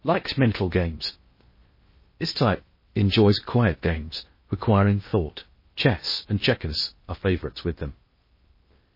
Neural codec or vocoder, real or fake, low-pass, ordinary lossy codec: codec, 16 kHz in and 24 kHz out, 0.6 kbps, FocalCodec, streaming, 2048 codes; fake; 5.4 kHz; MP3, 24 kbps